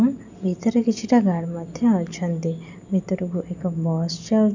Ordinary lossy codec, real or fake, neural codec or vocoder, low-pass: none; real; none; 7.2 kHz